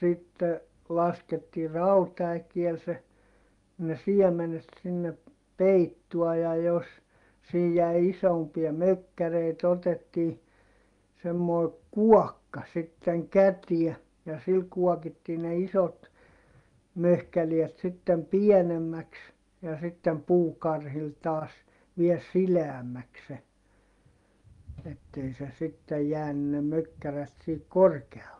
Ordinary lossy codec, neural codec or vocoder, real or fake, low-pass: Opus, 32 kbps; none; real; 10.8 kHz